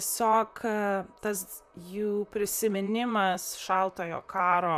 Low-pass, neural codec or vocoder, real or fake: 14.4 kHz; vocoder, 44.1 kHz, 128 mel bands, Pupu-Vocoder; fake